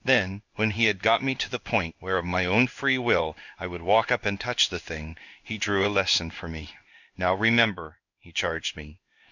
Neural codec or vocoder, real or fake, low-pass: codec, 16 kHz in and 24 kHz out, 1 kbps, XY-Tokenizer; fake; 7.2 kHz